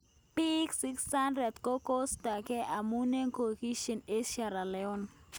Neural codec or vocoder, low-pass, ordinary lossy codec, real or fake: none; none; none; real